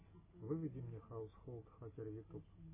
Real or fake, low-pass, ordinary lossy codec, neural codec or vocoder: real; 3.6 kHz; MP3, 16 kbps; none